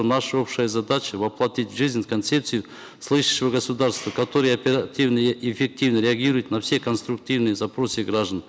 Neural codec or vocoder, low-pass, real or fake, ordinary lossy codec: none; none; real; none